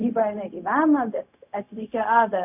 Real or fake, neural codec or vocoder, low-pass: fake; codec, 16 kHz, 0.4 kbps, LongCat-Audio-Codec; 3.6 kHz